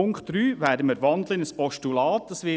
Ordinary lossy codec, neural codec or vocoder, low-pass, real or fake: none; none; none; real